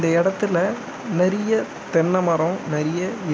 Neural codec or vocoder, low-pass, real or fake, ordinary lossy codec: none; none; real; none